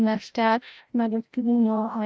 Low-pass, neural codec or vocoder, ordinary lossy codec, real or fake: none; codec, 16 kHz, 0.5 kbps, FreqCodec, larger model; none; fake